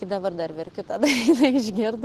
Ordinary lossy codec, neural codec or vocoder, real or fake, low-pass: Opus, 16 kbps; none; real; 14.4 kHz